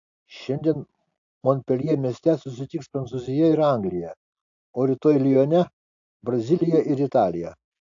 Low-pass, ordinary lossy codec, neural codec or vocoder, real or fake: 7.2 kHz; AAC, 64 kbps; none; real